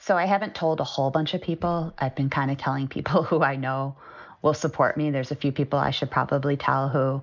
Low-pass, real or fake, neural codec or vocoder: 7.2 kHz; real; none